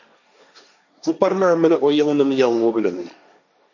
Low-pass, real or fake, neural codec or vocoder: 7.2 kHz; fake; codec, 16 kHz, 1.1 kbps, Voila-Tokenizer